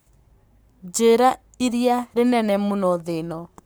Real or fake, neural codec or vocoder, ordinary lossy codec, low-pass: fake; codec, 44.1 kHz, 7.8 kbps, Pupu-Codec; none; none